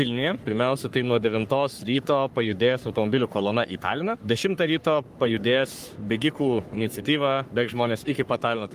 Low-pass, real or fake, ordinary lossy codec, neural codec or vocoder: 14.4 kHz; fake; Opus, 32 kbps; codec, 44.1 kHz, 3.4 kbps, Pupu-Codec